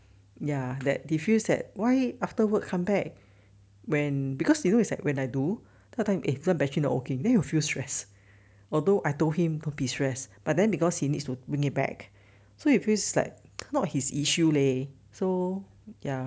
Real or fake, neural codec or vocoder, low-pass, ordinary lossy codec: real; none; none; none